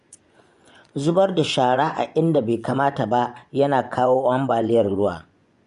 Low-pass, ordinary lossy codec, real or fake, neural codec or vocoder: 10.8 kHz; none; fake; vocoder, 24 kHz, 100 mel bands, Vocos